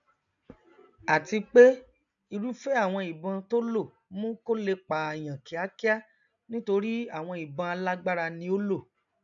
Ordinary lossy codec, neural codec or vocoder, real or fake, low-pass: none; none; real; 7.2 kHz